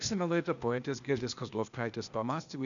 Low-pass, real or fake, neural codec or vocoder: 7.2 kHz; fake; codec, 16 kHz, 0.8 kbps, ZipCodec